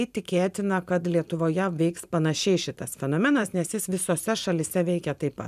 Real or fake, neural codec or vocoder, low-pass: fake; vocoder, 44.1 kHz, 128 mel bands every 512 samples, BigVGAN v2; 14.4 kHz